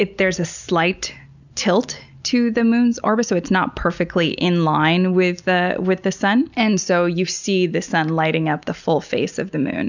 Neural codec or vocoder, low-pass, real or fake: none; 7.2 kHz; real